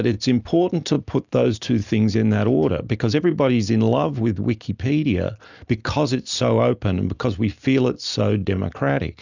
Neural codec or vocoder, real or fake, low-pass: none; real; 7.2 kHz